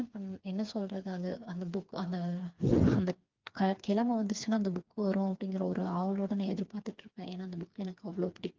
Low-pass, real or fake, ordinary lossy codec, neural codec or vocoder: 7.2 kHz; fake; Opus, 32 kbps; codec, 16 kHz, 4 kbps, FreqCodec, smaller model